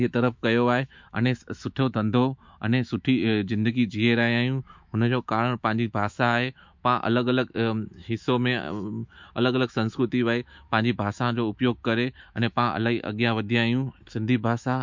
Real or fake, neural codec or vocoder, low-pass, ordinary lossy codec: fake; autoencoder, 48 kHz, 128 numbers a frame, DAC-VAE, trained on Japanese speech; 7.2 kHz; MP3, 64 kbps